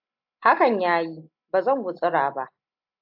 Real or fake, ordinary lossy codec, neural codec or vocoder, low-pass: real; AAC, 48 kbps; none; 5.4 kHz